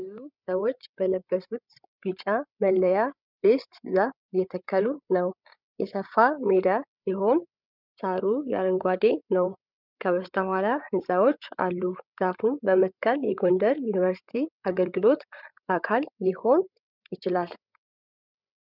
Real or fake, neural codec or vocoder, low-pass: fake; codec, 16 kHz, 8 kbps, FreqCodec, larger model; 5.4 kHz